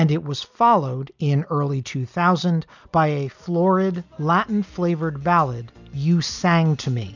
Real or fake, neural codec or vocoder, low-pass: real; none; 7.2 kHz